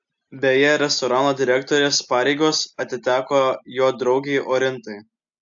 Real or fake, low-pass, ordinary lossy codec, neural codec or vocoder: real; 7.2 kHz; AAC, 64 kbps; none